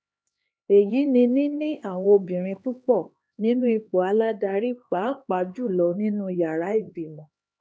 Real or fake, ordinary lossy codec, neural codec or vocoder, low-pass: fake; none; codec, 16 kHz, 2 kbps, X-Codec, HuBERT features, trained on LibriSpeech; none